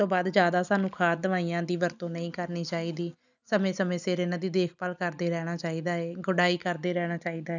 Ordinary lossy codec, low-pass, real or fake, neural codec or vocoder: none; 7.2 kHz; real; none